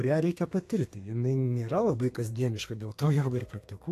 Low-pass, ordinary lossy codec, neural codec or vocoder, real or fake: 14.4 kHz; AAC, 64 kbps; codec, 32 kHz, 1.9 kbps, SNAC; fake